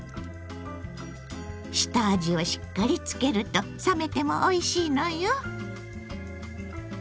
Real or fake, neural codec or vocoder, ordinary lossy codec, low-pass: real; none; none; none